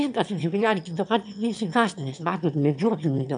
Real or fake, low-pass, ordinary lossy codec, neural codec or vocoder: fake; 9.9 kHz; MP3, 96 kbps; autoencoder, 22.05 kHz, a latent of 192 numbers a frame, VITS, trained on one speaker